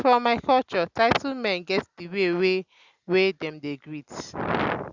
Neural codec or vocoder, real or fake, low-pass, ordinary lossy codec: none; real; 7.2 kHz; Opus, 64 kbps